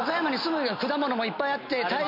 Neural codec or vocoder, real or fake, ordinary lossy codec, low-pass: none; real; MP3, 32 kbps; 5.4 kHz